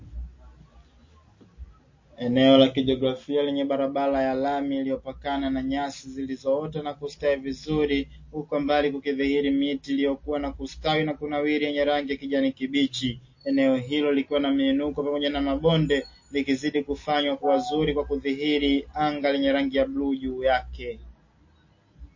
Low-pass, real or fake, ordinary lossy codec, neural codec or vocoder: 7.2 kHz; real; MP3, 32 kbps; none